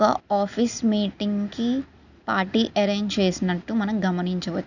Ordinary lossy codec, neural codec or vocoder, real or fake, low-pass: none; none; real; 7.2 kHz